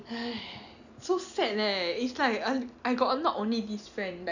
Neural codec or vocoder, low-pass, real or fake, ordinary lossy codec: none; 7.2 kHz; real; none